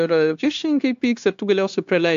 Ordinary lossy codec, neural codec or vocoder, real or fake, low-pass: AAC, 64 kbps; codec, 16 kHz, 0.9 kbps, LongCat-Audio-Codec; fake; 7.2 kHz